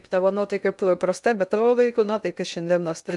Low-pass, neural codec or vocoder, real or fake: 10.8 kHz; codec, 16 kHz in and 24 kHz out, 0.6 kbps, FocalCodec, streaming, 2048 codes; fake